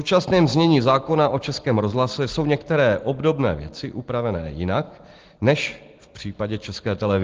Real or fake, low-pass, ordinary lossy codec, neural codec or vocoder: real; 7.2 kHz; Opus, 16 kbps; none